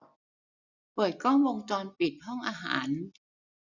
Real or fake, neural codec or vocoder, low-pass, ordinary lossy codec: real; none; 7.2 kHz; none